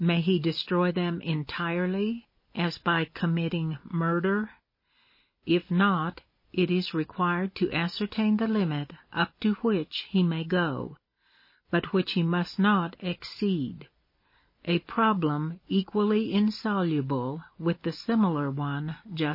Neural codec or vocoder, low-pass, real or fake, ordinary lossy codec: none; 5.4 kHz; real; MP3, 24 kbps